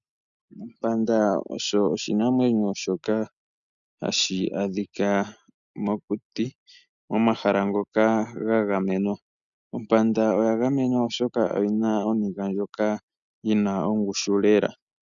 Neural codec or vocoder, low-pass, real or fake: none; 7.2 kHz; real